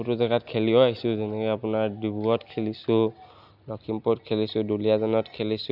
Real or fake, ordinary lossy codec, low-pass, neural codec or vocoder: real; none; 5.4 kHz; none